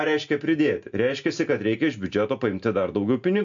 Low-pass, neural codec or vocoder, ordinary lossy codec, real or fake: 7.2 kHz; none; MP3, 64 kbps; real